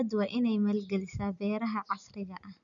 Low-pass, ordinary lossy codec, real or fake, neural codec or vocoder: 7.2 kHz; none; real; none